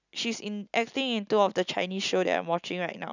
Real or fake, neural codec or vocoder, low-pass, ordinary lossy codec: real; none; 7.2 kHz; none